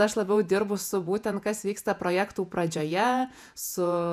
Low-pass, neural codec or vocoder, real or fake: 14.4 kHz; vocoder, 48 kHz, 128 mel bands, Vocos; fake